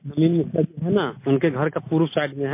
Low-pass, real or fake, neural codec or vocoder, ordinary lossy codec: 3.6 kHz; real; none; MP3, 24 kbps